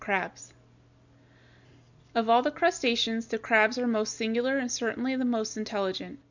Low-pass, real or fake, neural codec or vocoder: 7.2 kHz; real; none